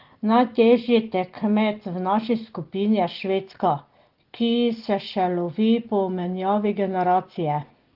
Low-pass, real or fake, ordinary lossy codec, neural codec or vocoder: 5.4 kHz; real; Opus, 16 kbps; none